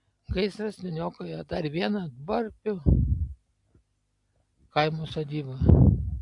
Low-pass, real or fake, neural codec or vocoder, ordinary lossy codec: 10.8 kHz; real; none; AAC, 64 kbps